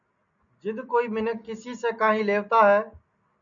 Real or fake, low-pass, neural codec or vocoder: real; 7.2 kHz; none